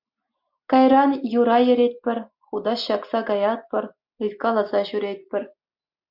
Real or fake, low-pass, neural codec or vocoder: real; 5.4 kHz; none